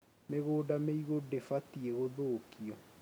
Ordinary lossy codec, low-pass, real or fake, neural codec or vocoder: none; none; real; none